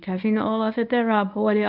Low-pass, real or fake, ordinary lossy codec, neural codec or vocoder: 5.4 kHz; fake; none; codec, 24 kHz, 0.9 kbps, WavTokenizer, medium speech release version 1